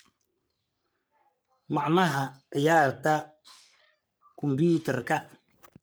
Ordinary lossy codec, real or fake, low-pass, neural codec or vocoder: none; fake; none; codec, 44.1 kHz, 3.4 kbps, Pupu-Codec